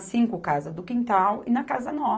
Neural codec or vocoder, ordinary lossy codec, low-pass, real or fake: none; none; none; real